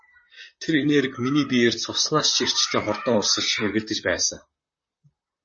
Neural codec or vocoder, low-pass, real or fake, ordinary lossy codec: vocoder, 44.1 kHz, 128 mel bands, Pupu-Vocoder; 9.9 kHz; fake; MP3, 32 kbps